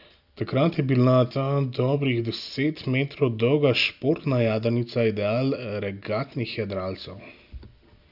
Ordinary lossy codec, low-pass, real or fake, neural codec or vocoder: none; 5.4 kHz; real; none